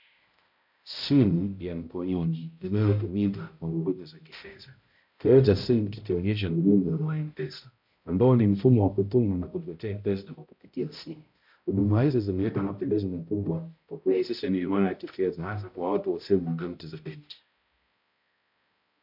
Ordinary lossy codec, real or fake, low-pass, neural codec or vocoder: MP3, 48 kbps; fake; 5.4 kHz; codec, 16 kHz, 0.5 kbps, X-Codec, HuBERT features, trained on balanced general audio